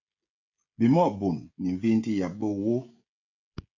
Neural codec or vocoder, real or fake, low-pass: codec, 16 kHz, 16 kbps, FreqCodec, smaller model; fake; 7.2 kHz